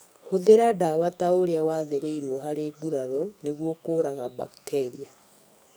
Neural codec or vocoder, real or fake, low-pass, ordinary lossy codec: codec, 44.1 kHz, 2.6 kbps, SNAC; fake; none; none